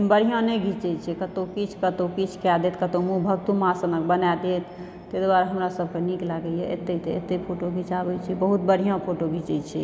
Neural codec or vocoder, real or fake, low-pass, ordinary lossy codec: none; real; none; none